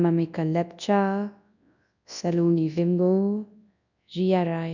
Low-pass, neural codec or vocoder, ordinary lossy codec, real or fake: 7.2 kHz; codec, 24 kHz, 0.9 kbps, WavTokenizer, large speech release; none; fake